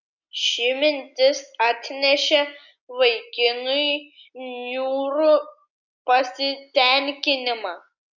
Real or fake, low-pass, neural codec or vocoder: real; 7.2 kHz; none